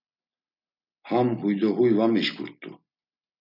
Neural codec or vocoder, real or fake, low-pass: none; real; 5.4 kHz